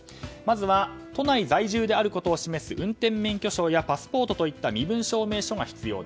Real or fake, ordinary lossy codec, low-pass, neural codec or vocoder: real; none; none; none